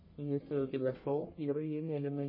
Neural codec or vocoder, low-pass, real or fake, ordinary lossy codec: codec, 44.1 kHz, 1.7 kbps, Pupu-Codec; 5.4 kHz; fake; MP3, 24 kbps